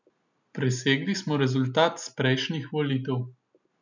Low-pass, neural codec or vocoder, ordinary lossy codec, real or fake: 7.2 kHz; none; none; real